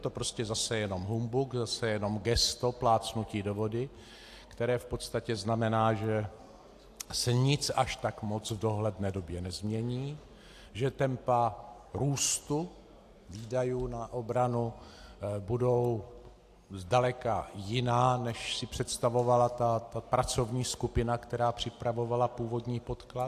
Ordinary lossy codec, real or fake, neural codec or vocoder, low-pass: AAC, 64 kbps; real; none; 14.4 kHz